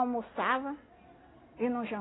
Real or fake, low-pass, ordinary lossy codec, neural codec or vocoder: real; 7.2 kHz; AAC, 16 kbps; none